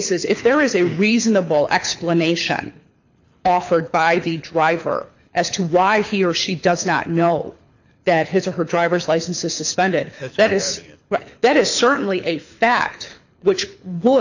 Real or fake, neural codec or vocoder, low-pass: fake; codec, 24 kHz, 6 kbps, HILCodec; 7.2 kHz